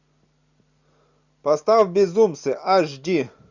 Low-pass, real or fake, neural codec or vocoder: 7.2 kHz; real; none